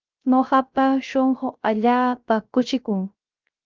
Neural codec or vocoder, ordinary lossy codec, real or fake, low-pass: codec, 16 kHz, 0.3 kbps, FocalCodec; Opus, 24 kbps; fake; 7.2 kHz